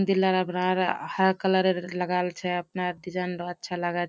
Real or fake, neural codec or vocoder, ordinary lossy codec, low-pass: real; none; none; none